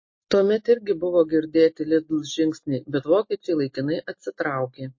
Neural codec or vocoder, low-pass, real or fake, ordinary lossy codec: none; 7.2 kHz; real; MP3, 32 kbps